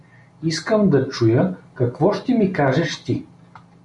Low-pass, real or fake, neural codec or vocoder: 10.8 kHz; real; none